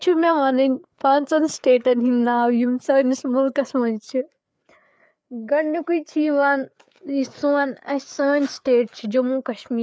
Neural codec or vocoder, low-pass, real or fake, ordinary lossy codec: codec, 16 kHz, 4 kbps, FreqCodec, larger model; none; fake; none